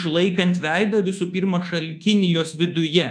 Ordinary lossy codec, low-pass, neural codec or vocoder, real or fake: MP3, 96 kbps; 9.9 kHz; codec, 24 kHz, 1.2 kbps, DualCodec; fake